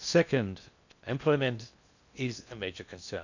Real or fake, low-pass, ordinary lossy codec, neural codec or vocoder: fake; 7.2 kHz; none; codec, 16 kHz in and 24 kHz out, 0.6 kbps, FocalCodec, streaming, 2048 codes